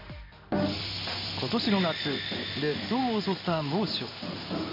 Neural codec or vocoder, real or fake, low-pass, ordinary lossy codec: codec, 16 kHz in and 24 kHz out, 1 kbps, XY-Tokenizer; fake; 5.4 kHz; MP3, 32 kbps